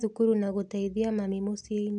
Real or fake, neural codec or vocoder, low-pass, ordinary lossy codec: real; none; 9.9 kHz; none